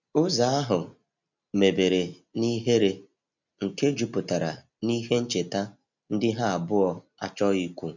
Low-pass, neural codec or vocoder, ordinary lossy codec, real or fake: 7.2 kHz; none; none; real